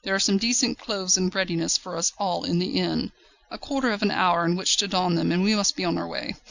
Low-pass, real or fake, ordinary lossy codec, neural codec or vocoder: 7.2 kHz; real; Opus, 64 kbps; none